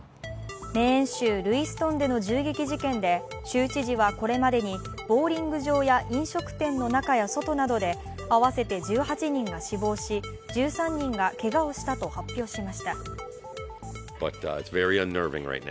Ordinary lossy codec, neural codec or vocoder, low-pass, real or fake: none; none; none; real